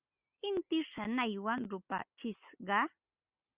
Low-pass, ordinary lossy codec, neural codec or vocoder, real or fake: 3.6 kHz; Opus, 64 kbps; none; real